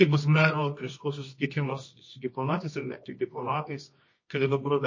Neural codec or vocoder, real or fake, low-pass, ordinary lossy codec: codec, 24 kHz, 0.9 kbps, WavTokenizer, medium music audio release; fake; 7.2 kHz; MP3, 32 kbps